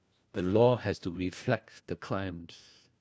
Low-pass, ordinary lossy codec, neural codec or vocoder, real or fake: none; none; codec, 16 kHz, 1 kbps, FunCodec, trained on LibriTTS, 50 frames a second; fake